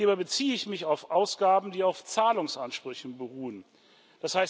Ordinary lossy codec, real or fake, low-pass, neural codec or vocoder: none; real; none; none